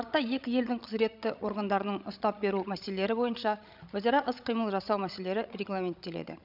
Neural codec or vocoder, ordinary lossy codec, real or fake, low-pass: codec, 16 kHz, 16 kbps, FreqCodec, larger model; none; fake; 5.4 kHz